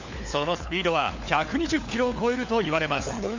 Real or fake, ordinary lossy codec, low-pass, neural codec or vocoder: fake; Opus, 64 kbps; 7.2 kHz; codec, 16 kHz, 4 kbps, FunCodec, trained on LibriTTS, 50 frames a second